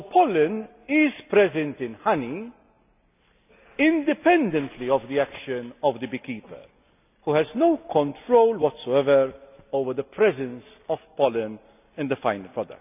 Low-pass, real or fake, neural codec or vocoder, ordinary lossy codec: 3.6 kHz; real; none; none